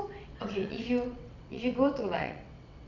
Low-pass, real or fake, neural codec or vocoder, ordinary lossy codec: 7.2 kHz; fake; vocoder, 22.05 kHz, 80 mel bands, WaveNeXt; none